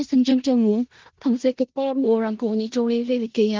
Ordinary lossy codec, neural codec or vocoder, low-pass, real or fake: Opus, 16 kbps; codec, 16 kHz in and 24 kHz out, 0.4 kbps, LongCat-Audio-Codec, four codebook decoder; 7.2 kHz; fake